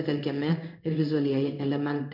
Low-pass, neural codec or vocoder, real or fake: 5.4 kHz; codec, 16 kHz in and 24 kHz out, 1 kbps, XY-Tokenizer; fake